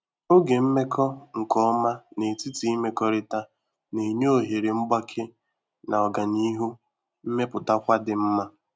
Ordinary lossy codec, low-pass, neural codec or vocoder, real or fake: none; none; none; real